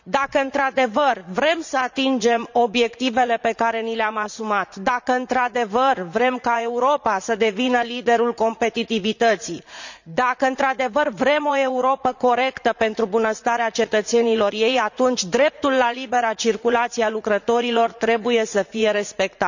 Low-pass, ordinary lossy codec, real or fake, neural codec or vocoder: 7.2 kHz; none; fake; vocoder, 44.1 kHz, 80 mel bands, Vocos